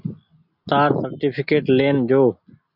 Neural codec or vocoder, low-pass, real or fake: none; 5.4 kHz; real